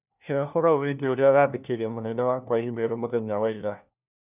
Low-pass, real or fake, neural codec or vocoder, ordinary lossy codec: 3.6 kHz; fake; codec, 16 kHz, 1 kbps, FunCodec, trained on LibriTTS, 50 frames a second; none